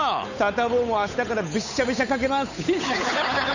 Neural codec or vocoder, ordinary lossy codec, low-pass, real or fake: codec, 16 kHz, 8 kbps, FunCodec, trained on Chinese and English, 25 frames a second; none; 7.2 kHz; fake